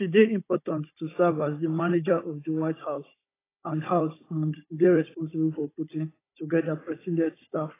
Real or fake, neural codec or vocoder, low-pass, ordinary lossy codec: fake; codec, 16 kHz, 16 kbps, FunCodec, trained on Chinese and English, 50 frames a second; 3.6 kHz; AAC, 16 kbps